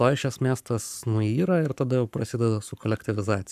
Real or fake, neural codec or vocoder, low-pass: fake; codec, 44.1 kHz, 7.8 kbps, Pupu-Codec; 14.4 kHz